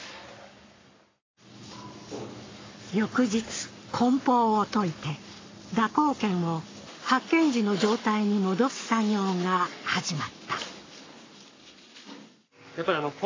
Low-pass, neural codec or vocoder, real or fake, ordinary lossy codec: 7.2 kHz; codec, 44.1 kHz, 7.8 kbps, Pupu-Codec; fake; AAC, 32 kbps